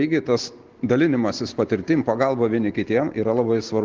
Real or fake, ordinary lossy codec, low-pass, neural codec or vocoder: real; Opus, 16 kbps; 7.2 kHz; none